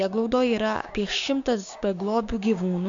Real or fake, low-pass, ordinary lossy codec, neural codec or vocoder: fake; 7.2 kHz; AAC, 64 kbps; codec, 16 kHz, 6 kbps, DAC